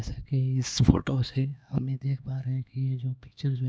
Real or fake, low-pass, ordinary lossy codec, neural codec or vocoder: fake; none; none; codec, 16 kHz, 2 kbps, X-Codec, HuBERT features, trained on LibriSpeech